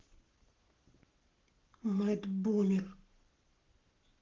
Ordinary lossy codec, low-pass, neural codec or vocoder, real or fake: Opus, 16 kbps; 7.2 kHz; codec, 44.1 kHz, 7.8 kbps, Pupu-Codec; fake